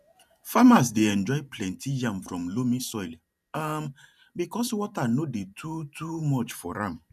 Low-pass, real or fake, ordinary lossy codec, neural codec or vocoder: 14.4 kHz; fake; none; vocoder, 44.1 kHz, 128 mel bands every 512 samples, BigVGAN v2